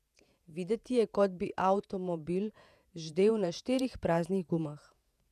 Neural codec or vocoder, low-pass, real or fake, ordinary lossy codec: vocoder, 48 kHz, 128 mel bands, Vocos; 14.4 kHz; fake; none